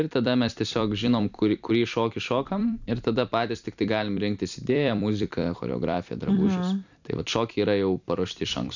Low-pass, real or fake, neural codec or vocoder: 7.2 kHz; fake; vocoder, 44.1 kHz, 128 mel bands every 256 samples, BigVGAN v2